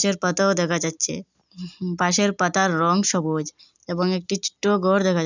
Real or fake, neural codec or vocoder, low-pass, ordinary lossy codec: real; none; 7.2 kHz; none